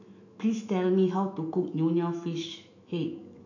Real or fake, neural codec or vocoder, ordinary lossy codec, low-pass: fake; autoencoder, 48 kHz, 128 numbers a frame, DAC-VAE, trained on Japanese speech; none; 7.2 kHz